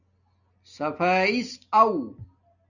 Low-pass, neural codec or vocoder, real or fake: 7.2 kHz; none; real